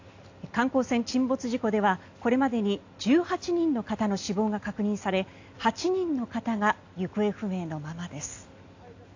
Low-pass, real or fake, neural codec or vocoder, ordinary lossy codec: 7.2 kHz; real; none; AAC, 48 kbps